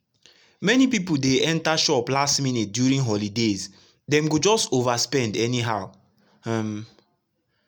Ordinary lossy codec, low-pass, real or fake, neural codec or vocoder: none; none; real; none